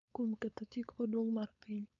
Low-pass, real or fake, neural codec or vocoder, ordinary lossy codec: 7.2 kHz; fake; codec, 16 kHz, 4.8 kbps, FACodec; none